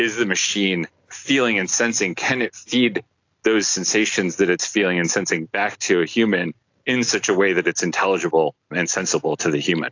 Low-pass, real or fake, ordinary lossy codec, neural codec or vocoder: 7.2 kHz; real; AAC, 48 kbps; none